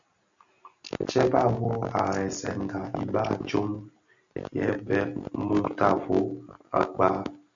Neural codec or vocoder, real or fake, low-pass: none; real; 7.2 kHz